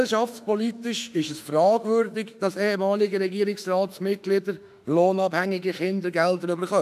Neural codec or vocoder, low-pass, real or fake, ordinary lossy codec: autoencoder, 48 kHz, 32 numbers a frame, DAC-VAE, trained on Japanese speech; 14.4 kHz; fake; none